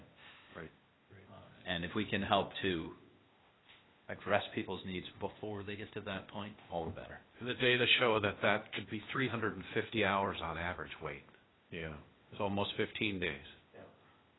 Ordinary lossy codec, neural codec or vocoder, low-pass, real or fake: AAC, 16 kbps; codec, 16 kHz, 0.8 kbps, ZipCodec; 7.2 kHz; fake